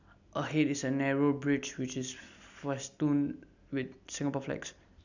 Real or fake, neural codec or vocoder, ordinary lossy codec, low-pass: real; none; none; 7.2 kHz